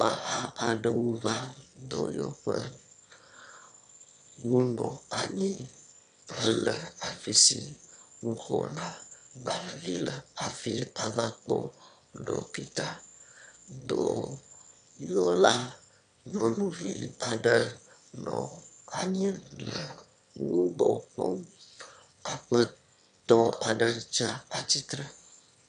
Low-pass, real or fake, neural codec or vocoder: 9.9 kHz; fake; autoencoder, 22.05 kHz, a latent of 192 numbers a frame, VITS, trained on one speaker